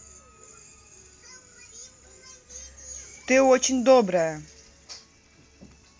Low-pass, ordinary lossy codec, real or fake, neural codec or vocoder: none; none; real; none